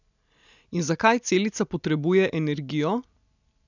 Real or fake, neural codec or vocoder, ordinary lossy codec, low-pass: real; none; none; 7.2 kHz